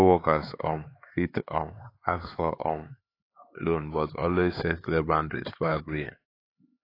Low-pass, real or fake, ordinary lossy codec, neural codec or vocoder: 5.4 kHz; fake; AAC, 24 kbps; codec, 16 kHz, 4 kbps, X-Codec, HuBERT features, trained on LibriSpeech